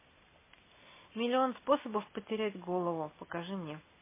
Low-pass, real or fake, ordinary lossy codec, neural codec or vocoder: 3.6 kHz; real; MP3, 16 kbps; none